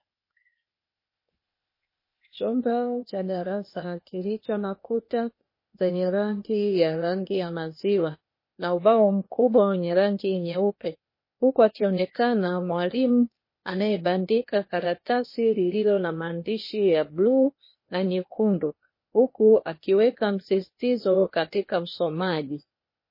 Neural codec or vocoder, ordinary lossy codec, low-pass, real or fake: codec, 16 kHz, 0.8 kbps, ZipCodec; MP3, 24 kbps; 5.4 kHz; fake